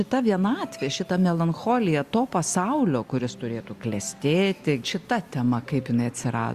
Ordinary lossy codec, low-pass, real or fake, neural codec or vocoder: Opus, 64 kbps; 14.4 kHz; real; none